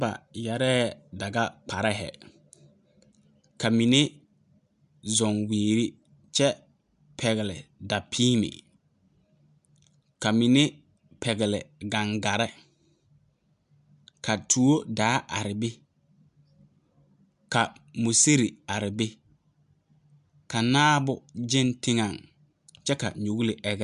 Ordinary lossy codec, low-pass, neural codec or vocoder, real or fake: MP3, 96 kbps; 10.8 kHz; none; real